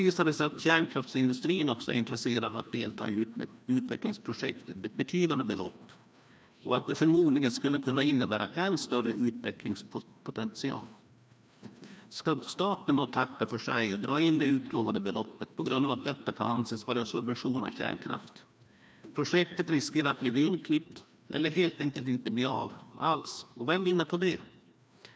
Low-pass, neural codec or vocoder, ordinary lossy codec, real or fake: none; codec, 16 kHz, 1 kbps, FreqCodec, larger model; none; fake